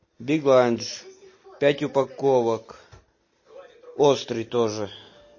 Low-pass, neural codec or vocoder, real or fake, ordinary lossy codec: 7.2 kHz; none; real; MP3, 32 kbps